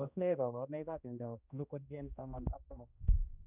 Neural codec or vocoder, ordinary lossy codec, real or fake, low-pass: codec, 16 kHz, 1 kbps, X-Codec, HuBERT features, trained on general audio; none; fake; 3.6 kHz